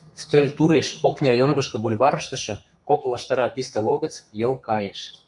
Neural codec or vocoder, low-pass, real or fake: codec, 32 kHz, 1.9 kbps, SNAC; 10.8 kHz; fake